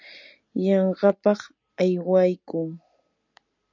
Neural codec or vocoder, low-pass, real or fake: none; 7.2 kHz; real